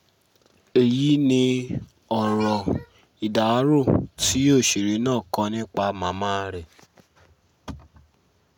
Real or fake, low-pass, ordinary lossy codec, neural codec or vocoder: real; 19.8 kHz; none; none